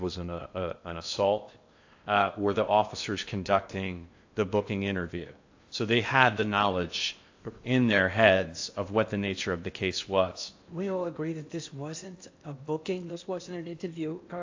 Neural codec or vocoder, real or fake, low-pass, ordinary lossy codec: codec, 16 kHz in and 24 kHz out, 0.8 kbps, FocalCodec, streaming, 65536 codes; fake; 7.2 kHz; AAC, 48 kbps